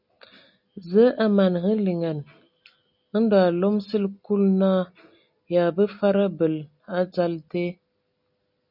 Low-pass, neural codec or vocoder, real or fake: 5.4 kHz; none; real